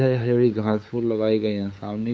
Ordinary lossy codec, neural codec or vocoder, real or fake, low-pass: none; codec, 16 kHz, 8 kbps, FunCodec, trained on LibriTTS, 25 frames a second; fake; none